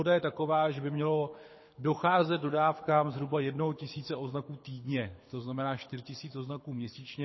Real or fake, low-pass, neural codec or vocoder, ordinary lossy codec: fake; 7.2 kHz; codec, 24 kHz, 6 kbps, HILCodec; MP3, 24 kbps